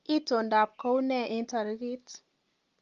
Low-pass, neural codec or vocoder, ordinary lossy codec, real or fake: 7.2 kHz; none; Opus, 32 kbps; real